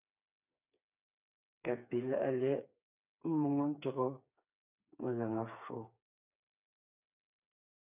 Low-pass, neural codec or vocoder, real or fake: 3.6 kHz; codec, 16 kHz, 4 kbps, FreqCodec, smaller model; fake